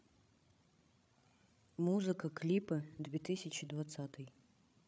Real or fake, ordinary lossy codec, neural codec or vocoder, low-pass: fake; none; codec, 16 kHz, 16 kbps, FreqCodec, larger model; none